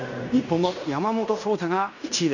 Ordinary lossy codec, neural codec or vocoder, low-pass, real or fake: none; codec, 16 kHz in and 24 kHz out, 0.9 kbps, LongCat-Audio-Codec, fine tuned four codebook decoder; 7.2 kHz; fake